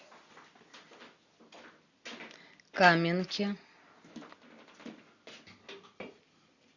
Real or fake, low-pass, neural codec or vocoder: real; 7.2 kHz; none